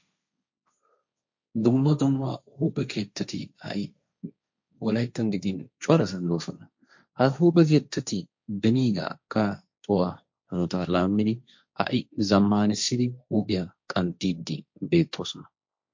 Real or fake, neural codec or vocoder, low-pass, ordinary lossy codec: fake; codec, 16 kHz, 1.1 kbps, Voila-Tokenizer; 7.2 kHz; MP3, 48 kbps